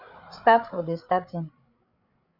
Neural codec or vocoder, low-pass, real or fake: codec, 16 kHz, 8 kbps, FreqCodec, larger model; 5.4 kHz; fake